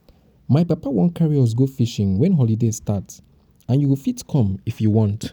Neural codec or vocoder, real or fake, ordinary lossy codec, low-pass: none; real; none; none